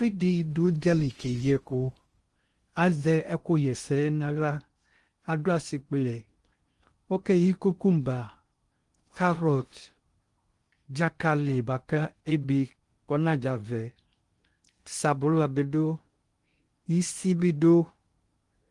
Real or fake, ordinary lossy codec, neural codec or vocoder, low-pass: fake; Opus, 24 kbps; codec, 16 kHz in and 24 kHz out, 0.6 kbps, FocalCodec, streaming, 2048 codes; 10.8 kHz